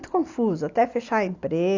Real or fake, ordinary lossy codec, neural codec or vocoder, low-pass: real; AAC, 48 kbps; none; 7.2 kHz